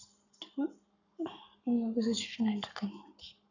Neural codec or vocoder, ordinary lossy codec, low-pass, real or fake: codec, 44.1 kHz, 7.8 kbps, Pupu-Codec; none; 7.2 kHz; fake